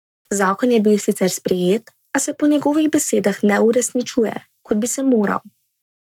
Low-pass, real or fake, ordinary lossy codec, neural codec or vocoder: 19.8 kHz; fake; none; codec, 44.1 kHz, 7.8 kbps, Pupu-Codec